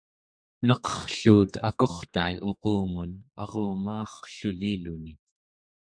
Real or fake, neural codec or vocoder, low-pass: fake; codec, 32 kHz, 1.9 kbps, SNAC; 9.9 kHz